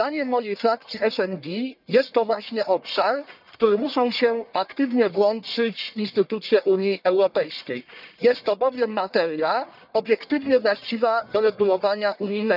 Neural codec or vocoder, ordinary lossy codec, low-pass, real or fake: codec, 44.1 kHz, 1.7 kbps, Pupu-Codec; none; 5.4 kHz; fake